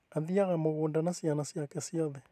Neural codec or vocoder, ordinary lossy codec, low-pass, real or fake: vocoder, 44.1 kHz, 128 mel bands every 512 samples, BigVGAN v2; none; 14.4 kHz; fake